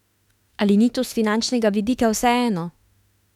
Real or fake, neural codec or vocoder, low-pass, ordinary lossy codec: fake; autoencoder, 48 kHz, 32 numbers a frame, DAC-VAE, trained on Japanese speech; 19.8 kHz; none